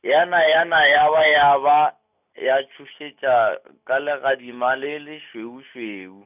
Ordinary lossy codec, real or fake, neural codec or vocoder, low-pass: none; real; none; 3.6 kHz